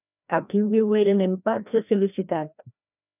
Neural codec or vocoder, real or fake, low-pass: codec, 16 kHz, 1 kbps, FreqCodec, larger model; fake; 3.6 kHz